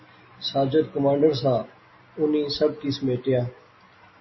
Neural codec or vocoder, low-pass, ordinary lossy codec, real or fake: none; 7.2 kHz; MP3, 24 kbps; real